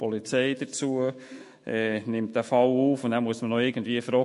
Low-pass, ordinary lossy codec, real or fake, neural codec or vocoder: 14.4 kHz; MP3, 48 kbps; fake; autoencoder, 48 kHz, 128 numbers a frame, DAC-VAE, trained on Japanese speech